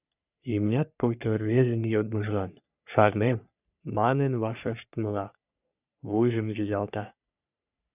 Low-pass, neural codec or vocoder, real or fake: 3.6 kHz; codec, 44.1 kHz, 3.4 kbps, Pupu-Codec; fake